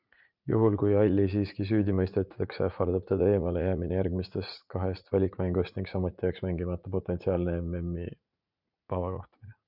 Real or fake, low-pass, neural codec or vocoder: fake; 5.4 kHz; vocoder, 44.1 kHz, 80 mel bands, Vocos